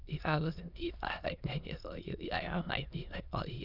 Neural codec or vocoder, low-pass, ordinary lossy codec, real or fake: autoencoder, 22.05 kHz, a latent of 192 numbers a frame, VITS, trained on many speakers; 5.4 kHz; none; fake